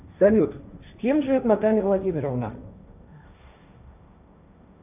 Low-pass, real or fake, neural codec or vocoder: 3.6 kHz; fake; codec, 16 kHz, 1.1 kbps, Voila-Tokenizer